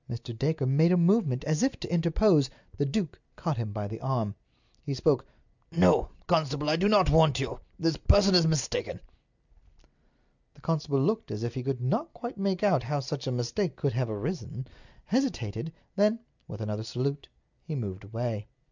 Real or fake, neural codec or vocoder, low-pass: real; none; 7.2 kHz